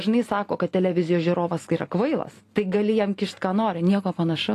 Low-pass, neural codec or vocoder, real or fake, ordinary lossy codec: 14.4 kHz; none; real; AAC, 48 kbps